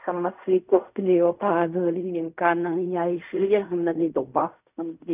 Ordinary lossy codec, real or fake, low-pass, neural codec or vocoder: none; fake; 3.6 kHz; codec, 16 kHz in and 24 kHz out, 0.4 kbps, LongCat-Audio-Codec, fine tuned four codebook decoder